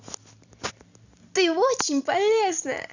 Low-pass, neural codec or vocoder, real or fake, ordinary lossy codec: 7.2 kHz; none; real; none